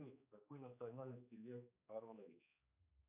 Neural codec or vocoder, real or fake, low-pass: codec, 16 kHz, 2 kbps, X-Codec, HuBERT features, trained on general audio; fake; 3.6 kHz